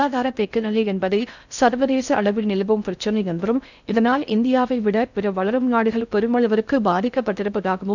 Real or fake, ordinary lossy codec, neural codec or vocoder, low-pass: fake; none; codec, 16 kHz in and 24 kHz out, 0.6 kbps, FocalCodec, streaming, 4096 codes; 7.2 kHz